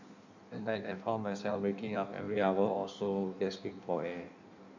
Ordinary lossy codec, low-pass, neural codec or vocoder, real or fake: none; 7.2 kHz; codec, 16 kHz in and 24 kHz out, 1.1 kbps, FireRedTTS-2 codec; fake